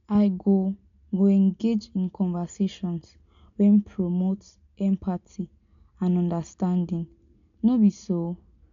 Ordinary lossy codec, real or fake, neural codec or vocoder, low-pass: MP3, 96 kbps; real; none; 7.2 kHz